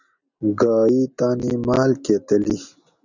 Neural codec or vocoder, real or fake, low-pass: none; real; 7.2 kHz